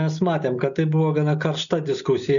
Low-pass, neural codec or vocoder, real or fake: 7.2 kHz; none; real